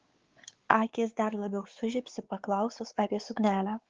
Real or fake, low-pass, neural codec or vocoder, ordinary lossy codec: fake; 7.2 kHz; codec, 16 kHz, 4 kbps, X-Codec, WavLM features, trained on Multilingual LibriSpeech; Opus, 16 kbps